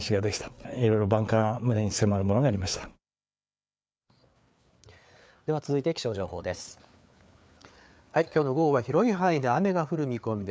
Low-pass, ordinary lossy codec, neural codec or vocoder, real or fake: none; none; codec, 16 kHz, 4 kbps, FreqCodec, larger model; fake